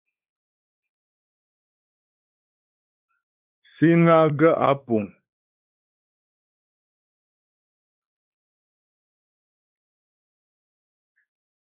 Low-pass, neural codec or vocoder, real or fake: 3.6 kHz; codec, 16 kHz, 4 kbps, X-Codec, WavLM features, trained on Multilingual LibriSpeech; fake